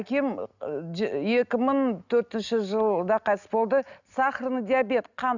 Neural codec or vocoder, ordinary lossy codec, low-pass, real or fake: none; none; 7.2 kHz; real